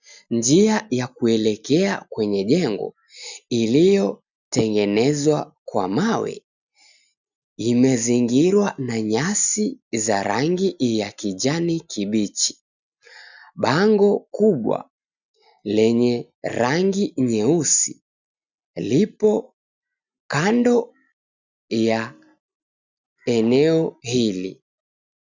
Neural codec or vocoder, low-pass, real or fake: none; 7.2 kHz; real